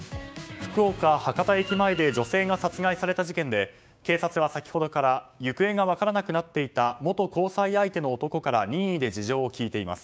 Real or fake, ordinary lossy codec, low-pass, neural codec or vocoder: fake; none; none; codec, 16 kHz, 6 kbps, DAC